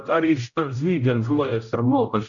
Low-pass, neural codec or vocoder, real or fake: 7.2 kHz; codec, 16 kHz, 0.5 kbps, X-Codec, HuBERT features, trained on general audio; fake